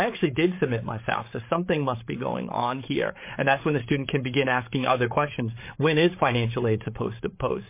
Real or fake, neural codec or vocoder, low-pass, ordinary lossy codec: fake; codec, 16 kHz, 8 kbps, FreqCodec, larger model; 3.6 kHz; MP3, 24 kbps